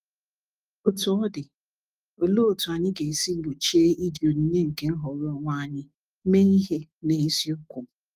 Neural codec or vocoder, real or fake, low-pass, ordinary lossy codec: autoencoder, 48 kHz, 128 numbers a frame, DAC-VAE, trained on Japanese speech; fake; 14.4 kHz; Opus, 32 kbps